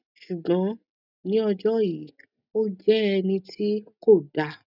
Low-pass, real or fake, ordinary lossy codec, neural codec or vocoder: 5.4 kHz; real; none; none